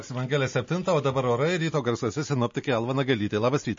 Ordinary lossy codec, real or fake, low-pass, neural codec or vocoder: MP3, 32 kbps; real; 7.2 kHz; none